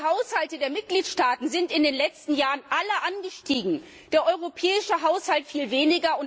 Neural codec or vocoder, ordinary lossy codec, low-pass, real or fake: none; none; none; real